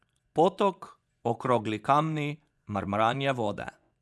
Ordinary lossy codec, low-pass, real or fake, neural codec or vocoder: none; none; fake; vocoder, 24 kHz, 100 mel bands, Vocos